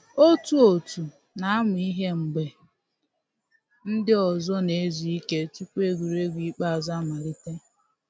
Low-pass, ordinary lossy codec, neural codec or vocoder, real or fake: none; none; none; real